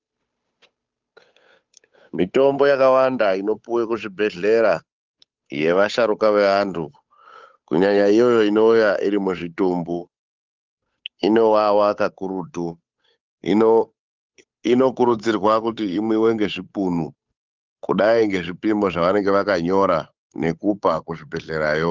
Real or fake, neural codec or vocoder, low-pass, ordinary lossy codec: fake; codec, 16 kHz, 8 kbps, FunCodec, trained on Chinese and English, 25 frames a second; 7.2 kHz; Opus, 24 kbps